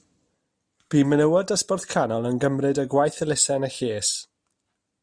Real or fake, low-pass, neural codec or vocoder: real; 9.9 kHz; none